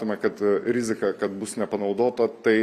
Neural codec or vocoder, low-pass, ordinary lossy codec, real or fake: vocoder, 44.1 kHz, 128 mel bands every 256 samples, BigVGAN v2; 14.4 kHz; Opus, 64 kbps; fake